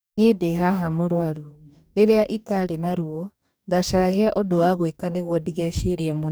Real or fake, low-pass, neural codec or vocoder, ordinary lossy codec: fake; none; codec, 44.1 kHz, 2.6 kbps, DAC; none